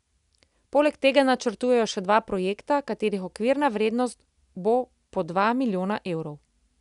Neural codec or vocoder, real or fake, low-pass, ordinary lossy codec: none; real; 10.8 kHz; none